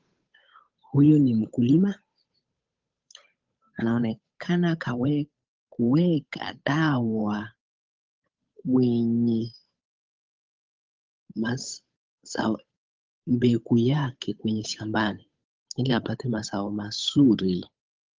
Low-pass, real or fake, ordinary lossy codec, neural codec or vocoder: 7.2 kHz; fake; Opus, 24 kbps; codec, 16 kHz, 8 kbps, FunCodec, trained on Chinese and English, 25 frames a second